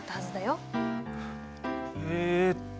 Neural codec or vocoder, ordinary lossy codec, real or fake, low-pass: none; none; real; none